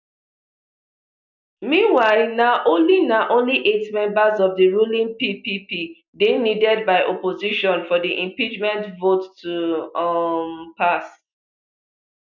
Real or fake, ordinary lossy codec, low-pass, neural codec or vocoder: real; none; 7.2 kHz; none